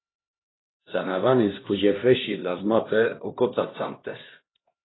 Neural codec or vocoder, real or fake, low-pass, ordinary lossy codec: codec, 16 kHz, 1 kbps, X-Codec, HuBERT features, trained on LibriSpeech; fake; 7.2 kHz; AAC, 16 kbps